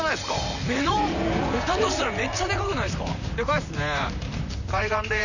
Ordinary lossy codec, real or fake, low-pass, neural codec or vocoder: none; fake; 7.2 kHz; vocoder, 44.1 kHz, 128 mel bands, Pupu-Vocoder